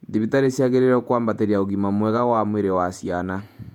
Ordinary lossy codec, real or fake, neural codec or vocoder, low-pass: MP3, 96 kbps; fake; vocoder, 48 kHz, 128 mel bands, Vocos; 19.8 kHz